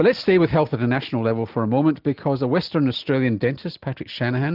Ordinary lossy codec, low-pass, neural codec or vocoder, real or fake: Opus, 24 kbps; 5.4 kHz; none; real